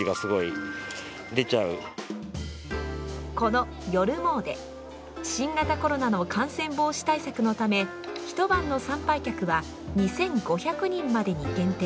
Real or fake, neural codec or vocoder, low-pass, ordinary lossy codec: real; none; none; none